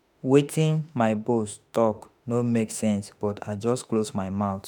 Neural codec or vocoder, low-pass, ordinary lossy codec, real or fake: autoencoder, 48 kHz, 32 numbers a frame, DAC-VAE, trained on Japanese speech; none; none; fake